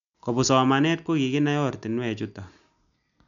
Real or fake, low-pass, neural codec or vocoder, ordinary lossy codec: real; 7.2 kHz; none; none